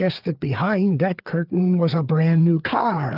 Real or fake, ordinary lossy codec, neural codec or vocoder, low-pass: fake; Opus, 24 kbps; codec, 16 kHz, 4 kbps, FreqCodec, larger model; 5.4 kHz